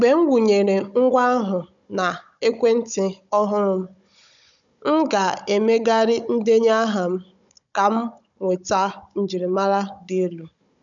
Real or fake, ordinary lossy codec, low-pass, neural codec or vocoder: fake; none; 7.2 kHz; codec, 16 kHz, 16 kbps, FunCodec, trained on Chinese and English, 50 frames a second